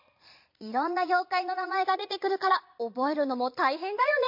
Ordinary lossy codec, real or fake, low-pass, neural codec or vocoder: MP3, 32 kbps; fake; 5.4 kHz; vocoder, 22.05 kHz, 80 mel bands, Vocos